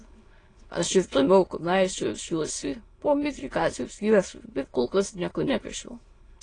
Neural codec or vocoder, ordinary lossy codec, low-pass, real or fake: autoencoder, 22.05 kHz, a latent of 192 numbers a frame, VITS, trained on many speakers; AAC, 32 kbps; 9.9 kHz; fake